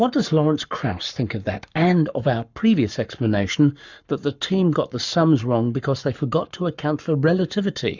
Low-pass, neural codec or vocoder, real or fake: 7.2 kHz; codec, 44.1 kHz, 7.8 kbps, Pupu-Codec; fake